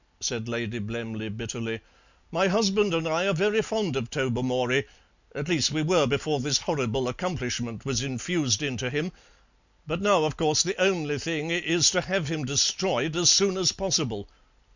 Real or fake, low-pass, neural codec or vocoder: real; 7.2 kHz; none